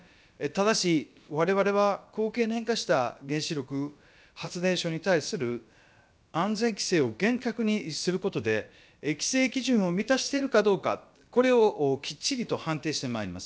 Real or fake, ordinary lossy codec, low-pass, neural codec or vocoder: fake; none; none; codec, 16 kHz, about 1 kbps, DyCAST, with the encoder's durations